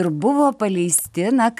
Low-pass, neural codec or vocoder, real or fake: 14.4 kHz; none; real